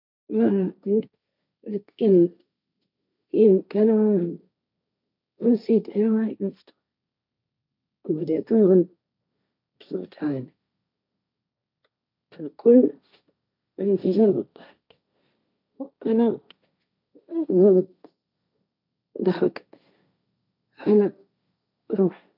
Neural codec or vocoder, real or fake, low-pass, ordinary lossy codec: codec, 16 kHz, 1.1 kbps, Voila-Tokenizer; fake; 5.4 kHz; none